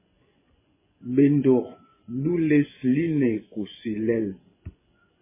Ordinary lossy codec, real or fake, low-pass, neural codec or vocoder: MP3, 16 kbps; fake; 3.6 kHz; vocoder, 24 kHz, 100 mel bands, Vocos